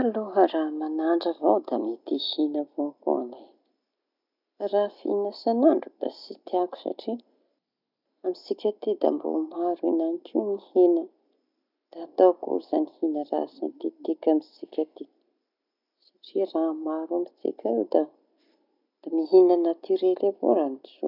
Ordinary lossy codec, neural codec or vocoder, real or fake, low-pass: none; none; real; 5.4 kHz